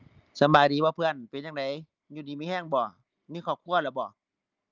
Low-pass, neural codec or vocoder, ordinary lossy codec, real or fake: 7.2 kHz; none; Opus, 24 kbps; real